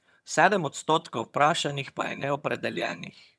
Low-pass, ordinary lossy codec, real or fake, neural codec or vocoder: none; none; fake; vocoder, 22.05 kHz, 80 mel bands, HiFi-GAN